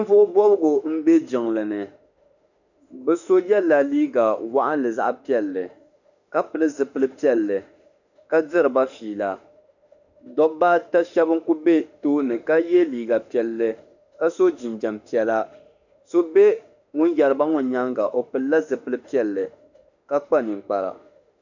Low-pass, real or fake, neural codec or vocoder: 7.2 kHz; fake; autoencoder, 48 kHz, 32 numbers a frame, DAC-VAE, trained on Japanese speech